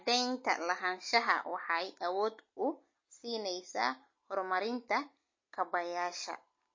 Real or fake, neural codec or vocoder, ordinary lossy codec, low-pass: real; none; MP3, 32 kbps; 7.2 kHz